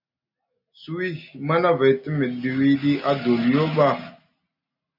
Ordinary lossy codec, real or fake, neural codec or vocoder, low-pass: MP3, 48 kbps; real; none; 5.4 kHz